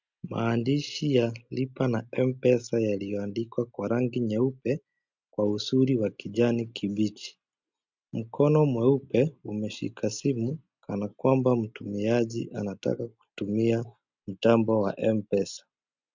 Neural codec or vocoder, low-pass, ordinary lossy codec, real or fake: none; 7.2 kHz; MP3, 64 kbps; real